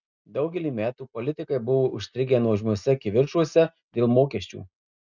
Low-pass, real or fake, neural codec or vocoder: 7.2 kHz; real; none